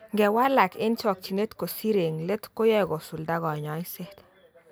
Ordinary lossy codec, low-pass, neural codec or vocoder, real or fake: none; none; none; real